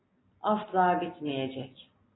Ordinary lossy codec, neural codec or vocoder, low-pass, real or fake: AAC, 16 kbps; none; 7.2 kHz; real